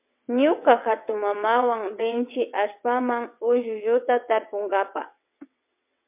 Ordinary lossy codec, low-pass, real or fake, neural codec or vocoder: MP3, 32 kbps; 3.6 kHz; fake; vocoder, 22.05 kHz, 80 mel bands, WaveNeXt